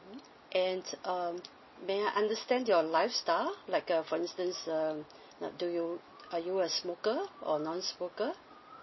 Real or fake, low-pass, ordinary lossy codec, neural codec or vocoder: real; 7.2 kHz; MP3, 24 kbps; none